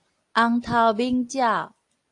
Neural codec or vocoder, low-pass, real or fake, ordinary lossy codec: none; 10.8 kHz; real; AAC, 48 kbps